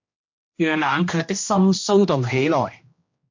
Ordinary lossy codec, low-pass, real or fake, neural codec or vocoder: MP3, 48 kbps; 7.2 kHz; fake; codec, 16 kHz, 1 kbps, X-Codec, HuBERT features, trained on general audio